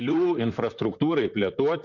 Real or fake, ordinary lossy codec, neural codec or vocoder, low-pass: fake; Opus, 64 kbps; vocoder, 44.1 kHz, 128 mel bands, Pupu-Vocoder; 7.2 kHz